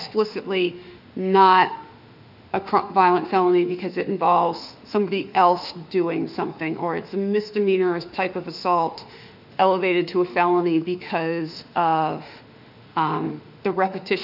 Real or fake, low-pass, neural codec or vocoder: fake; 5.4 kHz; autoencoder, 48 kHz, 32 numbers a frame, DAC-VAE, trained on Japanese speech